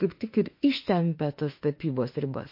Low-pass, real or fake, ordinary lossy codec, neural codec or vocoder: 5.4 kHz; fake; MP3, 32 kbps; autoencoder, 48 kHz, 32 numbers a frame, DAC-VAE, trained on Japanese speech